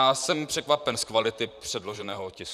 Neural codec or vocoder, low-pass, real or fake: vocoder, 44.1 kHz, 128 mel bands, Pupu-Vocoder; 14.4 kHz; fake